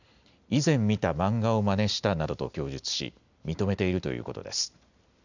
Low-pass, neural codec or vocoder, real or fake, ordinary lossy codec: 7.2 kHz; none; real; none